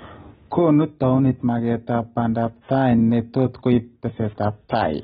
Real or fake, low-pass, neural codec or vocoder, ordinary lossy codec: real; 19.8 kHz; none; AAC, 16 kbps